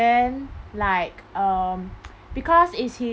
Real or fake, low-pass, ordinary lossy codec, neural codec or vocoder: real; none; none; none